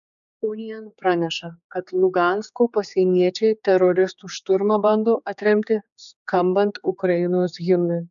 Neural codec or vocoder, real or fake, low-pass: codec, 16 kHz, 4 kbps, X-Codec, HuBERT features, trained on general audio; fake; 7.2 kHz